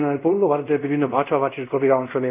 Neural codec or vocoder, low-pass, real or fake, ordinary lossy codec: codec, 24 kHz, 0.5 kbps, DualCodec; 3.6 kHz; fake; none